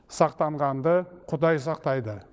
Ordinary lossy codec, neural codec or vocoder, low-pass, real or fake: none; codec, 16 kHz, 16 kbps, FunCodec, trained on LibriTTS, 50 frames a second; none; fake